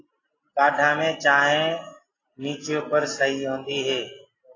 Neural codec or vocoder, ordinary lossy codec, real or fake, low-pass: none; AAC, 32 kbps; real; 7.2 kHz